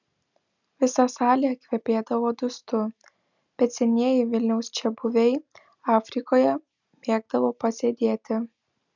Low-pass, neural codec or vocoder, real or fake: 7.2 kHz; none; real